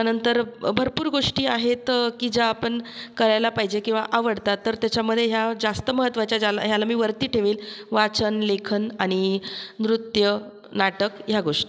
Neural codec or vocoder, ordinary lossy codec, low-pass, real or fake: none; none; none; real